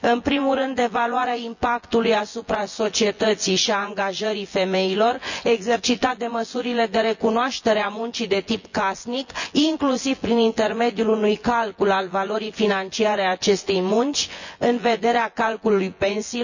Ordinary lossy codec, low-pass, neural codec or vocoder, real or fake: none; 7.2 kHz; vocoder, 24 kHz, 100 mel bands, Vocos; fake